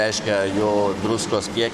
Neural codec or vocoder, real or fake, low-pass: codec, 44.1 kHz, 7.8 kbps, Pupu-Codec; fake; 14.4 kHz